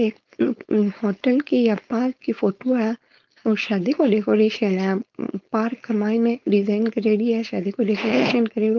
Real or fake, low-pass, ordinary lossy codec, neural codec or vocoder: fake; 7.2 kHz; Opus, 32 kbps; codec, 16 kHz, 4.8 kbps, FACodec